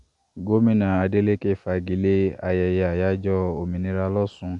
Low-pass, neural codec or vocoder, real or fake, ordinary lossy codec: 10.8 kHz; none; real; none